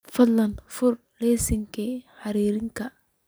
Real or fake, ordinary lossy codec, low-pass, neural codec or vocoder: real; none; none; none